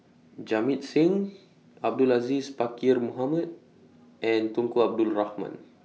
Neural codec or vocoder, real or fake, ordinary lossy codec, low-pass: none; real; none; none